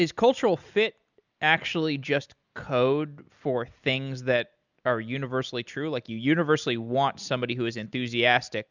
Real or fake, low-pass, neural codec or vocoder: real; 7.2 kHz; none